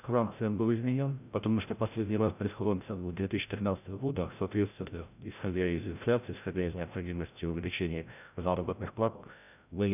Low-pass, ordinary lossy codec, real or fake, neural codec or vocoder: 3.6 kHz; none; fake; codec, 16 kHz, 0.5 kbps, FreqCodec, larger model